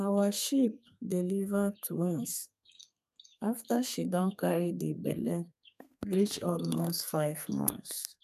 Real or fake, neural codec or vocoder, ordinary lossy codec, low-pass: fake; codec, 32 kHz, 1.9 kbps, SNAC; none; 14.4 kHz